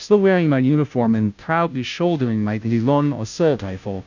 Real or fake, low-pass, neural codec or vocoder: fake; 7.2 kHz; codec, 16 kHz, 0.5 kbps, FunCodec, trained on Chinese and English, 25 frames a second